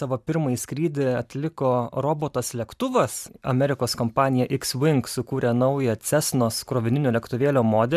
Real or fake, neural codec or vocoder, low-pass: fake; vocoder, 44.1 kHz, 128 mel bands every 512 samples, BigVGAN v2; 14.4 kHz